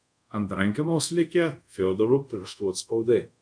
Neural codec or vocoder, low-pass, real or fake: codec, 24 kHz, 0.5 kbps, DualCodec; 9.9 kHz; fake